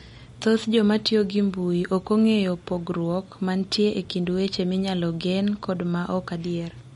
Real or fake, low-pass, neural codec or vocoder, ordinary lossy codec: real; 19.8 kHz; none; MP3, 48 kbps